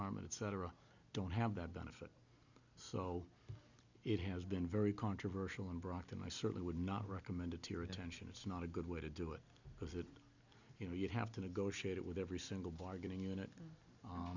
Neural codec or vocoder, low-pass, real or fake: none; 7.2 kHz; real